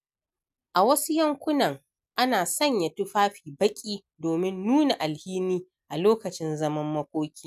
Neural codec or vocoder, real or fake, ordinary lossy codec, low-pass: none; real; none; 14.4 kHz